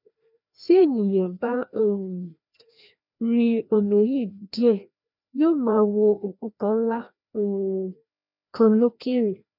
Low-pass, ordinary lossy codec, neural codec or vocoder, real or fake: 5.4 kHz; none; codec, 16 kHz, 1 kbps, FreqCodec, larger model; fake